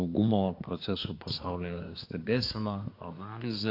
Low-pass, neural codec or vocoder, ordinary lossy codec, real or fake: 5.4 kHz; codec, 24 kHz, 1 kbps, SNAC; AAC, 32 kbps; fake